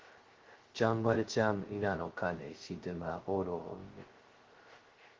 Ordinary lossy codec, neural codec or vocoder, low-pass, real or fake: Opus, 16 kbps; codec, 16 kHz, 0.2 kbps, FocalCodec; 7.2 kHz; fake